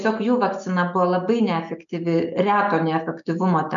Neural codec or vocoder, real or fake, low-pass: none; real; 7.2 kHz